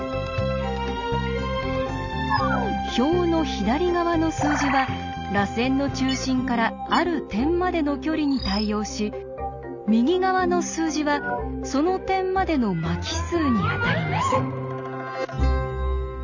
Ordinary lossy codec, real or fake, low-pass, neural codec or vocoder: none; real; 7.2 kHz; none